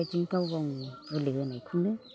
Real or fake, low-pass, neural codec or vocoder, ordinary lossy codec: real; none; none; none